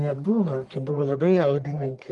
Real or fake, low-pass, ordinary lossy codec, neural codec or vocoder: fake; 10.8 kHz; Opus, 24 kbps; codec, 44.1 kHz, 1.7 kbps, Pupu-Codec